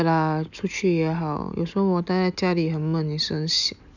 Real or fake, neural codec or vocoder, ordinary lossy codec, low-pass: real; none; none; 7.2 kHz